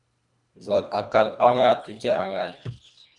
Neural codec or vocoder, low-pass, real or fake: codec, 24 kHz, 1.5 kbps, HILCodec; 10.8 kHz; fake